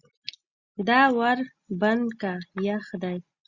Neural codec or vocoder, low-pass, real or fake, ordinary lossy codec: none; 7.2 kHz; real; Opus, 64 kbps